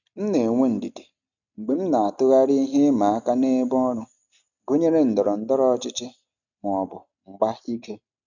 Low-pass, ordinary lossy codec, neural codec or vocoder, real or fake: 7.2 kHz; none; none; real